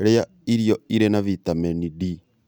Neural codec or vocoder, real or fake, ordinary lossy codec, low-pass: none; real; none; none